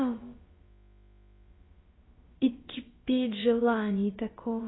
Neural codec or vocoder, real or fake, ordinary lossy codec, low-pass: codec, 16 kHz, about 1 kbps, DyCAST, with the encoder's durations; fake; AAC, 16 kbps; 7.2 kHz